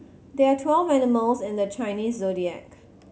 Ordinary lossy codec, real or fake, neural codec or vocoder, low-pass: none; real; none; none